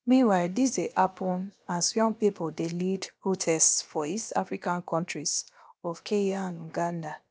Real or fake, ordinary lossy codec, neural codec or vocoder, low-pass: fake; none; codec, 16 kHz, about 1 kbps, DyCAST, with the encoder's durations; none